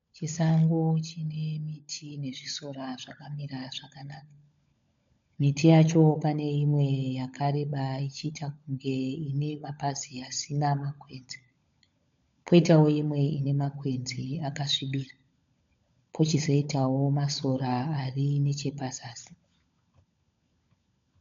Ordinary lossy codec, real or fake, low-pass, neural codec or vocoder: MP3, 64 kbps; fake; 7.2 kHz; codec, 16 kHz, 16 kbps, FunCodec, trained on LibriTTS, 50 frames a second